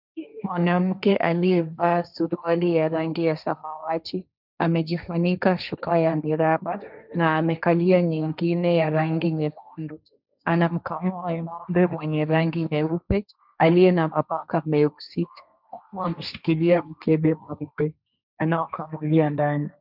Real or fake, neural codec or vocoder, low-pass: fake; codec, 16 kHz, 1.1 kbps, Voila-Tokenizer; 5.4 kHz